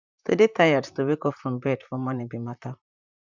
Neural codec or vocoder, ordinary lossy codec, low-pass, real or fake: vocoder, 44.1 kHz, 128 mel bands, Pupu-Vocoder; none; 7.2 kHz; fake